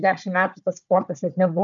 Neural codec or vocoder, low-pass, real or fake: codec, 16 kHz, 4 kbps, FunCodec, trained on Chinese and English, 50 frames a second; 7.2 kHz; fake